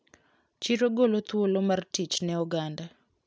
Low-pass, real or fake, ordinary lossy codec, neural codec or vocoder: none; real; none; none